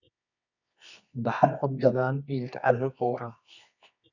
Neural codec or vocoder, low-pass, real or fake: codec, 24 kHz, 0.9 kbps, WavTokenizer, medium music audio release; 7.2 kHz; fake